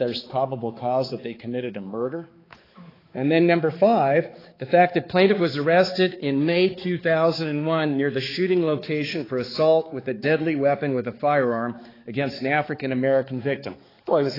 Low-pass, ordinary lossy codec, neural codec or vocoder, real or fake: 5.4 kHz; AAC, 24 kbps; codec, 16 kHz, 4 kbps, X-Codec, HuBERT features, trained on balanced general audio; fake